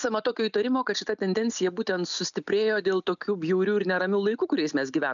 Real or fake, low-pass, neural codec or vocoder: real; 7.2 kHz; none